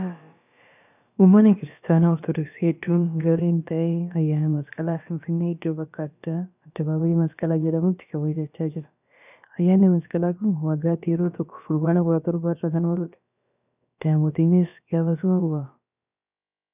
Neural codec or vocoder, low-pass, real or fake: codec, 16 kHz, about 1 kbps, DyCAST, with the encoder's durations; 3.6 kHz; fake